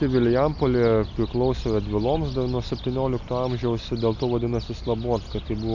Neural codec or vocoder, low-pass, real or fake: none; 7.2 kHz; real